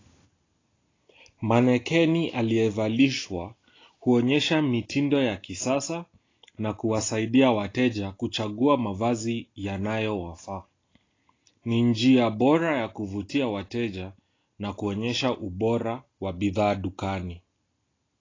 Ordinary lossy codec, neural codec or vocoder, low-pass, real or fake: AAC, 32 kbps; none; 7.2 kHz; real